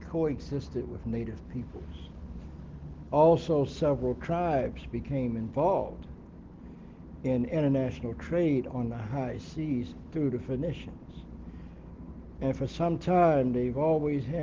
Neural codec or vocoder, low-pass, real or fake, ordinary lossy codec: none; 7.2 kHz; real; Opus, 16 kbps